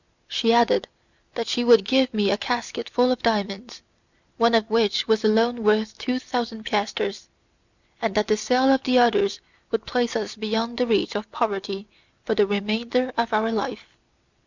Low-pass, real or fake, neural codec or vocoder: 7.2 kHz; real; none